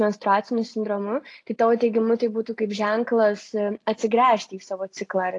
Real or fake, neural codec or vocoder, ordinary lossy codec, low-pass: real; none; AAC, 48 kbps; 10.8 kHz